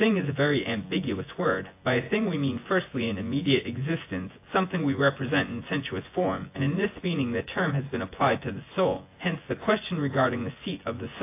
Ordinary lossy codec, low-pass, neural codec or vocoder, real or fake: AAC, 24 kbps; 3.6 kHz; vocoder, 24 kHz, 100 mel bands, Vocos; fake